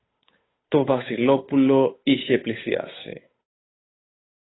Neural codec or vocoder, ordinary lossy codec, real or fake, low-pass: codec, 16 kHz, 8 kbps, FunCodec, trained on Chinese and English, 25 frames a second; AAC, 16 kbps; fake; 7.2 kHz